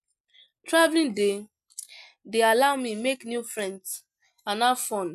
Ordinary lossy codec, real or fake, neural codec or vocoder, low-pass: MP3, 96 kbps; real; none; 14.4 kHz